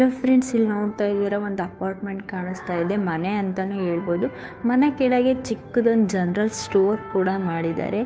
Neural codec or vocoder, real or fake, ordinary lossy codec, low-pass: codec, 16 kHz, 2 kbps, FunCodec, trained on Chinese and English, 25 frames a second; fake; none; none